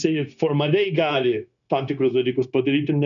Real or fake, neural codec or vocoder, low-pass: fake; codec, 16 kHz, 0.9 kbps, LongCat-Audio-Codec; 7.2 kHz